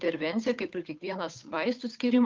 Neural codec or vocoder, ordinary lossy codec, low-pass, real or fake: codec, 16 kHz, 8 kbps, FunCodec, trained on Chinese and English, 25 frames a second; Opus, 24 kbps; 7.2 kHz; fake